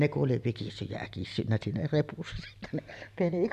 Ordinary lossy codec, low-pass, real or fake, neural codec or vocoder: none; 14.4 kHz; real; none